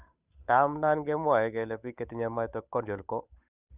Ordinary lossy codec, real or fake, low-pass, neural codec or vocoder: none; fake; 3.6 kHz; codec, 16 kHz, 8 kbps, FunCodec, trained on Chinese and English, 25 frames a second